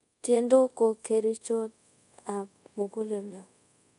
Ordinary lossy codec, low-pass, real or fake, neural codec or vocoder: none; 10.8 kHz; fake; codec, 24 kHz, 0.5 kbps, DualCodec